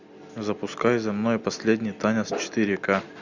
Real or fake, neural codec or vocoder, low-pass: real; none; 7.2 kHz